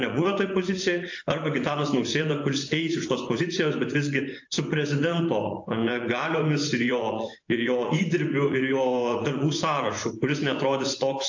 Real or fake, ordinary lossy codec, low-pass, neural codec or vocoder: fake; AAC, 48 kbps; 7.2 kHz; vocoder, 24 kHz, 100 mel bands, Vocos